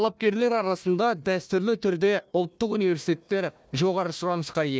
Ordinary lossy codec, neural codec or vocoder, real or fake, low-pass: none; codec, 16 kHz, 1 kbps, FunCodec, trained on Chinese and English, 50 frames a second; fake; none